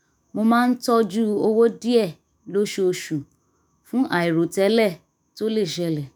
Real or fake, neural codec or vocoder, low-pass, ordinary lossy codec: fake; autoencoder, 48 kHz, 128 numbers a frame, DAC-VAE, trained on Japanese speech; none; none